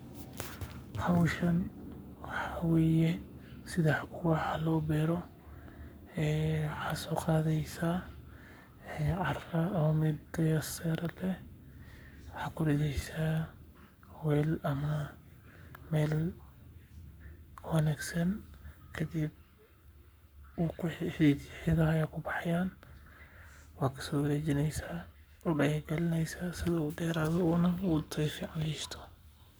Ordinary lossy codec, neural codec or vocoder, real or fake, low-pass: none; codec, 44.1 kHz, 7.8 kbps, Pupu-Codec; fake; none